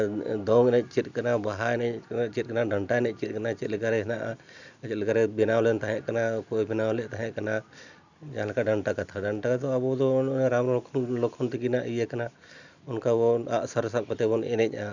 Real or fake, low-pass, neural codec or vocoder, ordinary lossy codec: real; 7.2 kHz; none; none